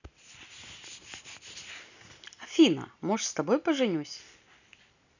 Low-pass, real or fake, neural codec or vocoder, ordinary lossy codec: 7.2 kHz; real; none; none